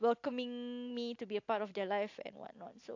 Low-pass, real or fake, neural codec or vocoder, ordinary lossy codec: 7.2 kHz; real; none; AAC, 48 kbps